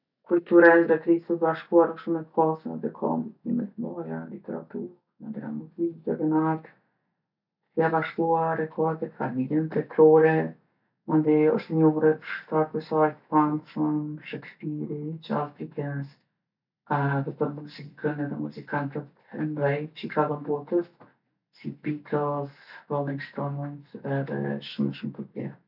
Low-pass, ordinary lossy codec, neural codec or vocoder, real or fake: 5.4 kHz; none; none; real